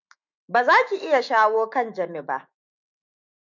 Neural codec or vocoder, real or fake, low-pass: autoencoder, 48 kHz, 128 numbers a frame, DAC-VAE, trained on Japanese speech; fake; 7.2 kHz